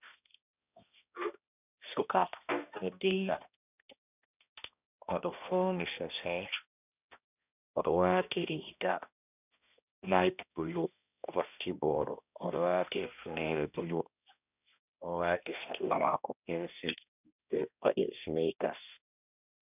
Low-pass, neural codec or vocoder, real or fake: 3.6 kHz; codec, 16 kHz, 1 kbps, X-Codec, HuBERT features, trained on balanced general audio; fake